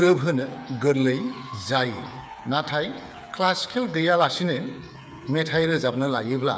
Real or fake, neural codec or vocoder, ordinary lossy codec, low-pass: fake; codec, 16 kHz, 8 kbps, FreqCodec, smaller model; none; none